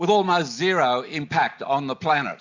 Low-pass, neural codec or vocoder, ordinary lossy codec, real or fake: 7.2 kHz; none; MP3, 64 kbps; real